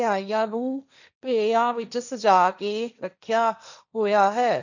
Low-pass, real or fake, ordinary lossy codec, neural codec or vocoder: 7.2 kHz; fake; none; codec, 16 kHz, 1.1 kbps, Voila-Tokenizer